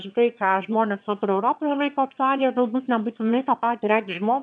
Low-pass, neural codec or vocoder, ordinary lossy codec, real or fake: 9.9 kHz; autoencoder, 22.05 kHz, a latent of 192 numbers a frame, VITS, trained on one speaker; MP3, 96 kbps; fake